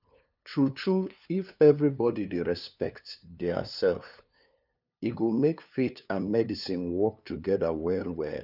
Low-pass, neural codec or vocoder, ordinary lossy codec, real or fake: 5.4 kHz; codec, 16 kHz, 2 kbps, FunCodec, trained on LibriTTS, 25 frames a second; none; fake